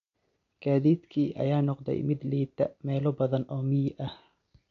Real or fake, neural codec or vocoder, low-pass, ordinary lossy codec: real; none; 7.2 kHz; MP3, 64 kbps